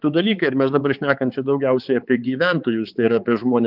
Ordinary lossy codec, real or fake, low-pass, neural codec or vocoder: Opus, 32 kbps; fake; 5.4 kHz; codec, 16 kHz, 4 kbps, X-Codec, HuBERT features, trained on balanced general audio